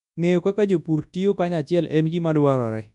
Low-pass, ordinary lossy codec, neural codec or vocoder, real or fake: 10.8 kHz; none; codec, 24 kHz, 0.9 kbps, WavTokenizer, large speech release; fake